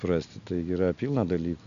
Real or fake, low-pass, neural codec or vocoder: real; 7.2 kHz; none